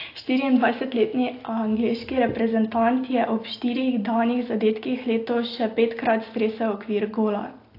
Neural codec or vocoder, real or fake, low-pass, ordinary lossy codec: none; real; 5.4 kHz; AAC, 32 kbps